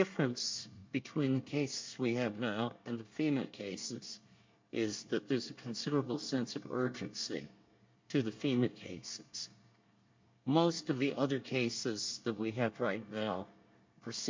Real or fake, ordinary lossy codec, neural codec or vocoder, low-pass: fake; MP3, 48 kbps; codec, 24 kHz, 1 kbps, SNAC; 7.2 kHz